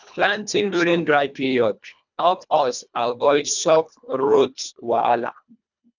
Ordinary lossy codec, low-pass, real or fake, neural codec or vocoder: none; 7.2 kHz; fake; codec, 24 kHz, 1.5 kbps, HILCodec